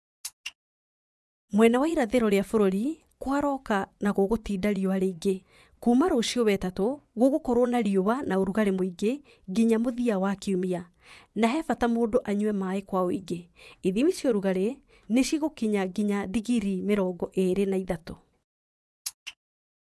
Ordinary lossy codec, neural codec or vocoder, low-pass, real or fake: none; vocoder, 24 kHz, 100 mel bands, Vocos; none; fake